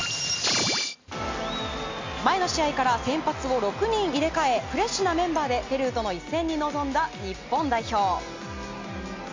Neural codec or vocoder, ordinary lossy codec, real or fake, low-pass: none; MP3, 48 kbps; real; 7.2 kHz